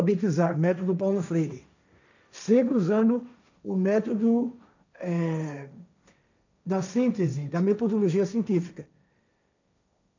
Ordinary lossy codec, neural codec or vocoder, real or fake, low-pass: none; codec, 16 kHz, 1.1 kbps, Voila-Tokenizer; fake; 7.2 kHz